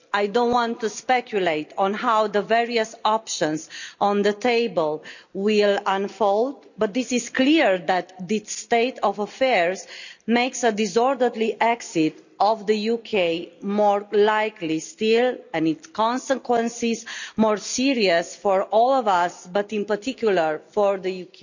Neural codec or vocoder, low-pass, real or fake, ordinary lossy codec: none; 7.2 kHz; real; none